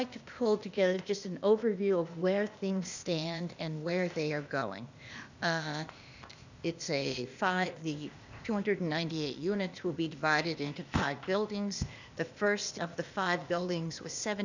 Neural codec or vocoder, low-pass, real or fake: codec, 16 kHz, 0.8 kbps, ZipCodec; 7.2 kHz; fake